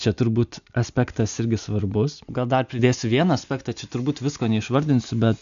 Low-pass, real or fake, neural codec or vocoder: 7.2 kHz; real; none